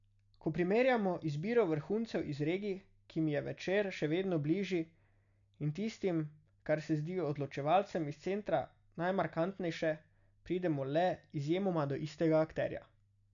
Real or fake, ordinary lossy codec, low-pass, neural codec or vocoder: real; none; 7.2 kHz; none